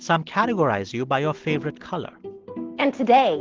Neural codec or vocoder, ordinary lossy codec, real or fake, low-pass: none; Opus, 16 kbps; real; 7.2 kHz